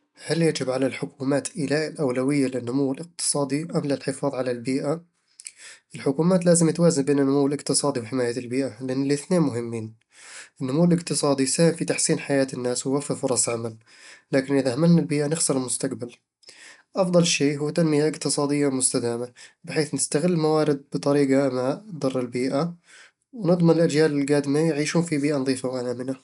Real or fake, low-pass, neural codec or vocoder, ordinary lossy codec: real; 10.8 kHz; none; none